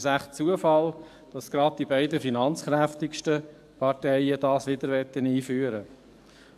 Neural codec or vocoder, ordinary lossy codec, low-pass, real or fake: codec, 44.1 kHz, 7.8 kbps, DAC; AAC, 96 kbps; 14.4 kHz; fake